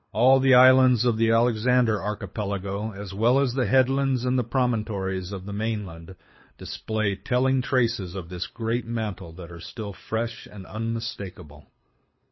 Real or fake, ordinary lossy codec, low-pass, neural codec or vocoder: fake; MP3, 24 kbps; 7.2 kHz; codec, 24 kHz, 6 kbps, HILCodec